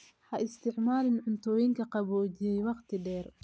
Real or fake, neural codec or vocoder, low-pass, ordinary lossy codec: real; none; none; none